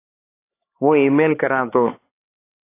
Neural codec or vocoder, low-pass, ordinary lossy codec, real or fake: codec, 16 kHz, 4 kbps, X-Codec, HuBERT features, trained on LibriSpeech; 3.6 kHz; AAC, 24 kbps; fake